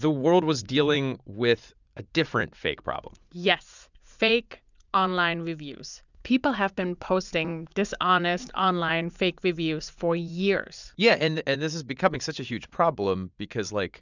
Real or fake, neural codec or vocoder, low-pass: fake; vocoder, 44.1 kHz, 80 mel bands, Vocos; 7.2 kHz